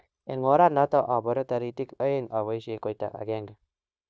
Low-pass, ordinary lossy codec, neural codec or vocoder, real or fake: none; none; codec, 16 kHz, 0.9 kbps, LongCat-Audio-Codec; fake